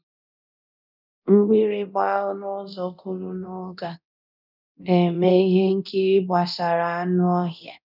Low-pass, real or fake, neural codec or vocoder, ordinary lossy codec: 5.4 kHz; fake; codec, 24 kHz, 0.9 kbps, DualCodec; none